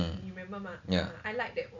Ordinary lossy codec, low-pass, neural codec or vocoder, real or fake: none; 7.2 kHz; none; real